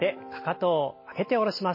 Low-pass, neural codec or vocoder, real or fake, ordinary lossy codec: 5.4 kHz; none; real; MP3, 24 kbps